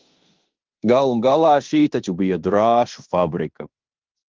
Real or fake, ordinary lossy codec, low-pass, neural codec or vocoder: fake; Opus, 32 kbps; 7.2 kHz; codec, 16 kHz in and 24 kHz out, 0.9 kbps, LongCat-Audio-Codec, fine tuned four codebook decoder